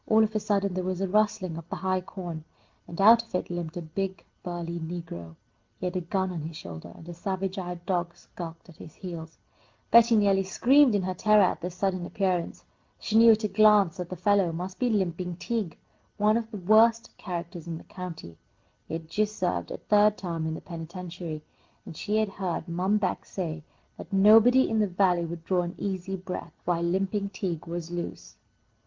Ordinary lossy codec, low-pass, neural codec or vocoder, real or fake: Opus, 24 kbps; 7.2 kHz; none; real